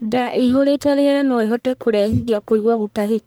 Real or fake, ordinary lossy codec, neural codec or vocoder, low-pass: fake; none; codec, 44.1 kHz, 1.7 kbps, Pupu-Codec; none